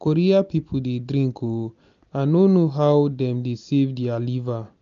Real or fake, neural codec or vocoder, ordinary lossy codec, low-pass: real; none; none; 7.2 kHz